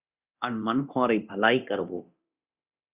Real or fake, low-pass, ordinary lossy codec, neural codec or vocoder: fake; 3.6 kHz; Opus, 64 kbps; codec, 24 kHz, 0.9 kbps, DualCodec